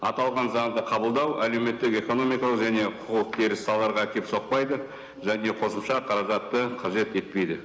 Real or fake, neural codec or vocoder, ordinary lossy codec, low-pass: real; none; none; none